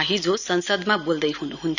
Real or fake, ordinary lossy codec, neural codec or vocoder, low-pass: real; none; none; 7.2 kHz